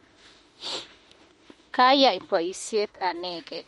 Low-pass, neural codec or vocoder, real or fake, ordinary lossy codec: 19.8 kHz; autoencoder, 48 kHz, 32 numbers a frame, DAC-VAE, trained on Japanese speech; fake; MP3, 48 kbps